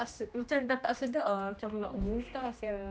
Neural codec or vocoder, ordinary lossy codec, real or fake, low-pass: codec, 16 kHz, 1 kbps, X-Codec, HuBERT features, trained on general audio; none; fake; none